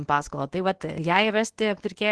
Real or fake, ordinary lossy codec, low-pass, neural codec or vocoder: fake; Opus, 16 kbps; 10.8 kHz; codec, 24 kHz, 0.9 kbps, WavTokenizer, medium speech release version 1